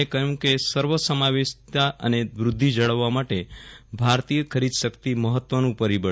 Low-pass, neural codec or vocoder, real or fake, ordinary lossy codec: none; none; real; none